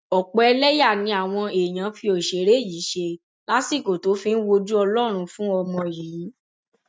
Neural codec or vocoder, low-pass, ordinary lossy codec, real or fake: none; none; none; real